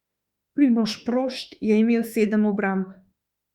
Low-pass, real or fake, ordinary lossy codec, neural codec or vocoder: 19.8 kHz; fake; Opus, 64 kbps; autoencoder, 48 kHz, 32 numbers a frame, DAC-VAE, trained on Japanese speech